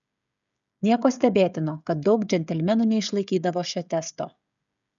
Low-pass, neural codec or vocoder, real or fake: 7.2 kHz; codec, 16 kHz, 16 kbps, FreqCodec, smaller model; fake